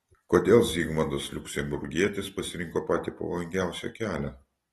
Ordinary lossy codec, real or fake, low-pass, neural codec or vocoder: AAC, 32 kbps; real; 19.8 kHz; none